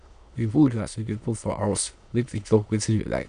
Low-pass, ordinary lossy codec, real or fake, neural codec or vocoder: 9.9 kHz; none; fake; autoencoder, 22.05 kHz, a latent of 192 numbers a frame, VITS, trained on many speakers